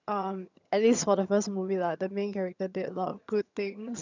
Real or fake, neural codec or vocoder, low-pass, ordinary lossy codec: fake; vocoder, 22.05 kHz, 80 mel bands, HiFi-GAN; 7.2 kHz; none